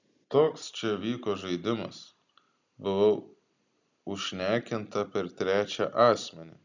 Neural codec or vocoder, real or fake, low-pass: none; real; 7.2 kHz